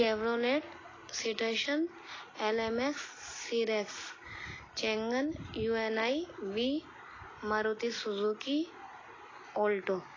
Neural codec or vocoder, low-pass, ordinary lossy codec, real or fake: none; 7.2 kHz; AAC, 32 kbps; real